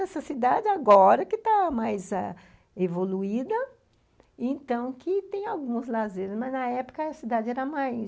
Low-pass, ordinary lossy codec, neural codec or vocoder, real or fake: none; none; none; real